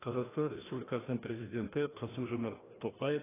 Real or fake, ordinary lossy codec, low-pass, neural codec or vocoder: fake; AAC, 16 kbps; 3.6 kHz; codec, 16 kHz, 1 kbps, FreqCodec, larger model